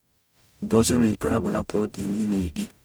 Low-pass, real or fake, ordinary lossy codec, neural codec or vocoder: none; fake; none; codec, 44.1 kHz, 0.9 kbps, DAC